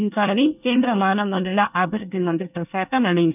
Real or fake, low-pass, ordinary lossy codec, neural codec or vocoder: fake; 3.6 kHz; none; codec, 24 kHz, 1 kbps, SNAC